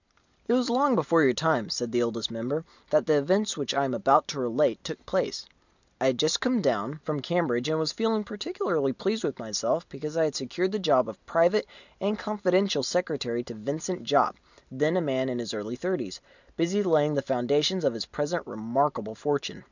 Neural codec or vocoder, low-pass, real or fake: none; 7.2 kHz; real